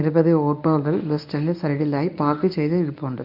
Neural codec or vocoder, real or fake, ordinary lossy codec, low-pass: codec, 24 kHz, 0.9 kbps, WavTokenizer, medium speech release version 1; fake; none; 5.4 kHz